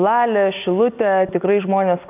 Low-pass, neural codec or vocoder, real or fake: 3.6 kHz; none; real